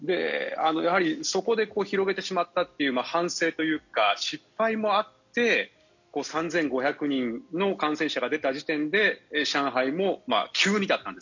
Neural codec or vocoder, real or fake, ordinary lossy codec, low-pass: none; real; none; 7.2 kHz